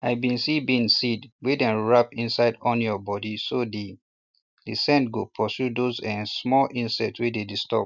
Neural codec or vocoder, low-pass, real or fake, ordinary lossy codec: none; 7.2 kHz; real; none